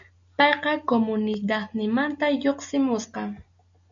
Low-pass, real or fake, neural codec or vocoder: 7.2 kHz; real; none